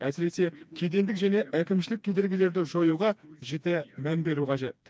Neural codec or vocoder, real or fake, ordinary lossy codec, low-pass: codec, 16 kHz, 2 kbps, FreqCodec, smaller model; fake; none; none